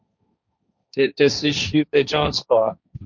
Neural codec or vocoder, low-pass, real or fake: codec, 16 kHz, 1.1 kbps, Voila-Tokenizer; 7.2 kHz; fake